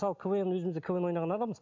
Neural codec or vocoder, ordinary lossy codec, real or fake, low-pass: none; MP3, 64 kbps; real; 7.2 kHz